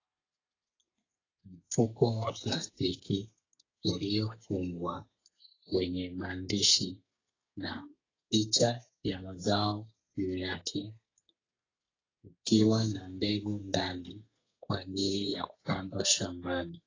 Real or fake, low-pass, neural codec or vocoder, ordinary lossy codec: fake; 7.2 kHz; codec, 32 kHz, 1.9 kbps, SNAC; AAC, 32 kbps